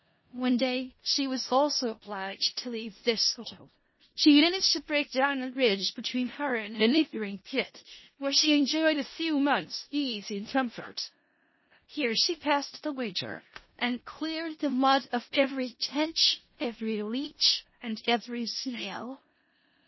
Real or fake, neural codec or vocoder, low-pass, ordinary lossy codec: fake; codec, 16 kHz in and 24 kHz out, 0.4 kbps, LongCat-Audio-Codec, four codebook decoder; 7.2 kHz; MP3, 24 kbps